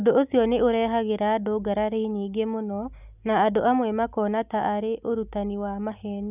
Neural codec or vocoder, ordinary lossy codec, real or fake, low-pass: none; none; real; 3.6 kHz